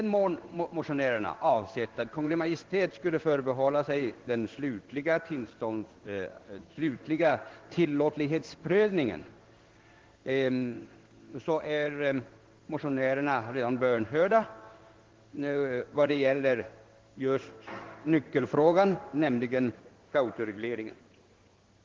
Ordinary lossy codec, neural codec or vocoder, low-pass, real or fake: Opus, 16 kbps; codec, 16 kHz in and 24 kHz out, 1 kbps, XY-Tokenizer; 7.2 kHz; fake